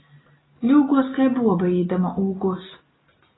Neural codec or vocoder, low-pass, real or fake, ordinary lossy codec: none; 7.2 kHz; real; AAC, 16 kbps